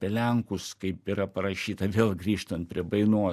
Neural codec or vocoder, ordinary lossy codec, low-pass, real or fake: none; MP3, 96 kbps; 14.4 kHz; real